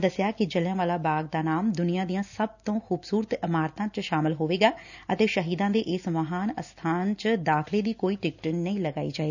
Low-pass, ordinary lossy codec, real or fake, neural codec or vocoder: 7.2 kHz; none; real; none